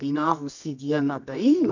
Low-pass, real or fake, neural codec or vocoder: 7.2 kHz; fake; codec, 24 kHz, 0.9 kbps, WavTokenizer, medium music audio release